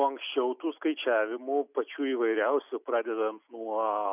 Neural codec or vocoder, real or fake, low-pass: none; real; 3.6 kHz